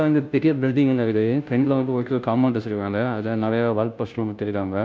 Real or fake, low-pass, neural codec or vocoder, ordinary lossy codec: fake; none; codec, 16 kHz, 0.5 kbps, FunCodec, trained on Chinese and English, 25 frames a second; none